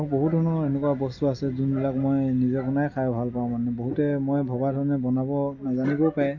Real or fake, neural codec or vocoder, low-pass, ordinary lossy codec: real; none; 7.2 kHz; none